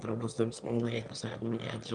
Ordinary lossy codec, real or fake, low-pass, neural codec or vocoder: Opus, 32 kbps; fake; 9.9 kHz; autoencoder, 22.05 kHz, a latent of 192 numbers a frame, VITS, trained on one speaker